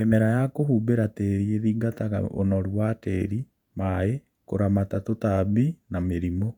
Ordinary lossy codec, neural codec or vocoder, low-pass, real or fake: none; vocoder, 48 kHz, 128 mel bands, Vocos; 19.8 kHz; fake